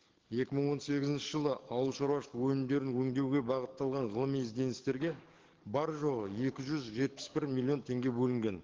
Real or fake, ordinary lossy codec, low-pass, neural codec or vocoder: fake; Opus, 16 kbps; 7.2 kHz; codec, 44.1 kHz, 7.8 kbps, DAC